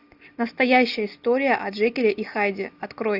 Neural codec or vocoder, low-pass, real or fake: none; 5.4 kHz; real